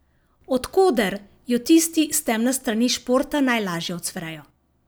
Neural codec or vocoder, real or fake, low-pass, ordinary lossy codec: none; real; none; none